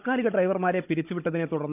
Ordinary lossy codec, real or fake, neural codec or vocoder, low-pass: none; fake; codec, 16 kHz, 16 kbps, FunCodec, trained on Chinese and English, 50 frames a second; 3.6 kHz